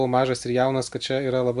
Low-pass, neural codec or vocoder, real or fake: 10.8 kHz; none; real